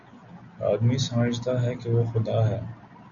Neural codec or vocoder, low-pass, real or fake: none; 7.2 kHz; real